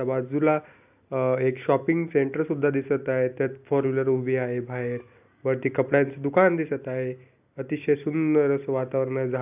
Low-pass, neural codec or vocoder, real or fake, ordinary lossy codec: 3.6 kHz; none; real; AAC, 32 kbps